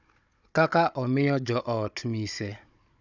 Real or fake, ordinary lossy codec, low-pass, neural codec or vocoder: fake; none; 7.2 kHz; codec, 16 kHz, 16 kbps, FunCodec, trained on Chinese and English, 50 frames a second